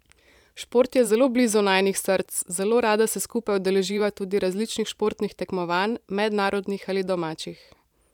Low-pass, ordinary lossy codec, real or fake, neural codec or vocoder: 19.8 kHz; none; fake; vocoder, 44.1 kHz, 128 mel bands every 512 samples, BigVGAN v2